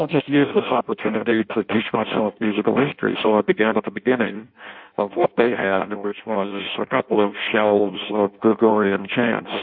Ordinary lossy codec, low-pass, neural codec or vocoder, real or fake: MP3, 48 kbps; 5.4 kHz; codec, 16 kHz in and 24 kHz out, 0.6 kbps, FireRedTTS-2 codec; fake